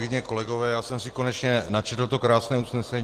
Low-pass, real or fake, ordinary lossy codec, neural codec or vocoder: 14.4 kHz; real; Opus, 16 kbps; none